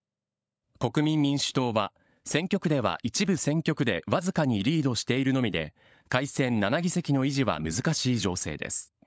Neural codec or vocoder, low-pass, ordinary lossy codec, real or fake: codec, 16 kHz, 16 kbps, FunCodec, trained on LibriTTS, 50 frames a second; none; none; fake